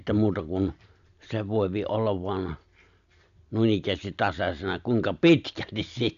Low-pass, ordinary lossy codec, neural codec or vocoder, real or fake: 7.2 kHz; none; none; real